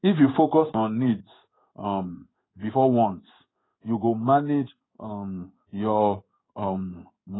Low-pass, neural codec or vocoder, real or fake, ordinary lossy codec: 7.2 kHz; codec, 16 kHz in and 24 kHz out, 1 kbps, XY-Tokenizer; fake; AAC, 16 kbps